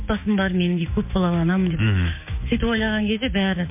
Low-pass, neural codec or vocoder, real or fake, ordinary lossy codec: 3.6 kHz; codec, 16 kHz, 6 kbps, DAC; fake; MP3, 24 kbps